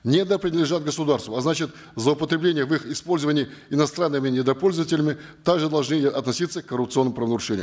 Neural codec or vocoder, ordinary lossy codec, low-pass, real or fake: none; none; none; real